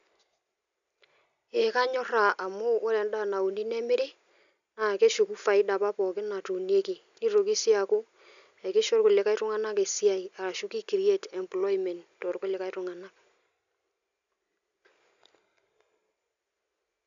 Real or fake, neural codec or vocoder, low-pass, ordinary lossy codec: real; none; 7.2 kHz; none